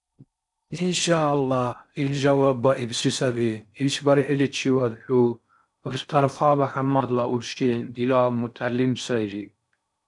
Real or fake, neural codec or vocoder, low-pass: fake; codec, 16 kHz in and 24 kHz out, 0.6 kbps, FocalCodec, streaming, 4096 codes; 10.8 kHz